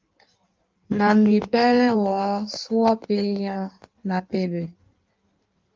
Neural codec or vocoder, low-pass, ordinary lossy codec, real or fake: codec, 16 kHz in and 24 kHz out, 1.1 kbps, FireRedTTS-2 codec; 7.2 kHz; Opus, 24 kbps; fake